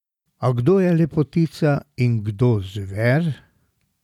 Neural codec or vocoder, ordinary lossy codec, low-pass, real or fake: none; none; 19.8 kHz; real